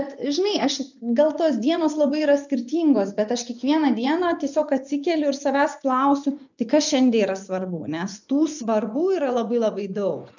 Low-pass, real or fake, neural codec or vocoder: 7.2 kHz; fake; vocoder, 24 kHz, 100 mel bands, Vocos